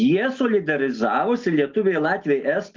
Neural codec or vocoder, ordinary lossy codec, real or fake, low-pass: none; Opus, 32 kbps; real; 7.2 kHz